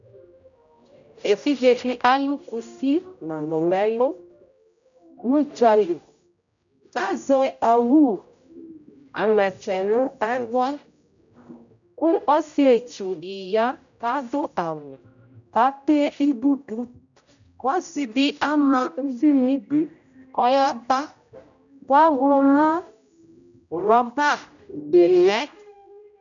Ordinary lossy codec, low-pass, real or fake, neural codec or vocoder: MP3, 96 kbps; 7.2 kHz; fake; codec, 16 kHz, 0.5 kbps, X-Codec, HuBERT features, trained on general audio